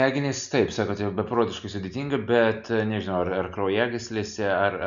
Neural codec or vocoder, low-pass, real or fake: none; 7.2 kHz; real